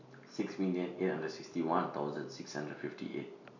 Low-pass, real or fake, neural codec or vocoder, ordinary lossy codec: 7.2 kHz; real; none; none